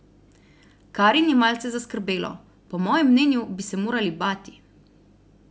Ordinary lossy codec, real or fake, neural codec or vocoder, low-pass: none; real; none; none